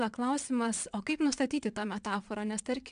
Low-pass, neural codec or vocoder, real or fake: 9.9 kHz; vocoder, 22.05 kHz, 80 mel bands, WaveNeXt; fake